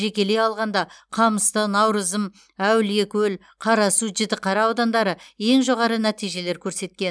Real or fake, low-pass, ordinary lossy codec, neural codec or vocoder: real; none; none; none